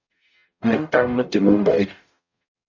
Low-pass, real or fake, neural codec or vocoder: 7.2 kHz; fake; codec, 44.1 kHz, 0.9 kbps, DAC